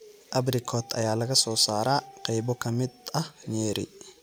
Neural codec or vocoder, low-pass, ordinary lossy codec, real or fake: none; none; none; real